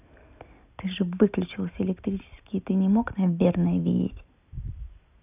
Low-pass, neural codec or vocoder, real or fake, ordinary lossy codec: 3.6 kHz; none; real; none